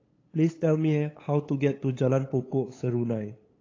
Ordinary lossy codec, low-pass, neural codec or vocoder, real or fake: AAC, 32 kbps; 7.2 kHz; codec, 16 kHz, 8 kbps, FunCodec, trained on LibriTTS, 25 frames a second; fake